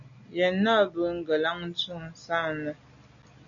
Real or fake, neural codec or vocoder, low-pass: real; none; 7.2 kHz